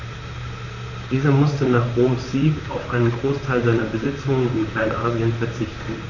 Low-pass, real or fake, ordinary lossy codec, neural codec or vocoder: 7.2 kHz; fake; none; vocoder, 44.1 kHz, 128 mel bands, Pupu-Vocoder